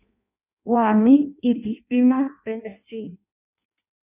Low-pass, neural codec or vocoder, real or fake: 3.6 kHz; codec, 16 kHz in and 24 kHz out, 0.6 kbps, FireRedTTS-2 codec; fake